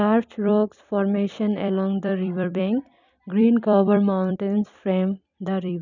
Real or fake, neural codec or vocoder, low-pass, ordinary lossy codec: fake; vocoder, 44.1 kHz, 128 mel bands every 256 samples, BigVGAN v2; 7.2 kHz; none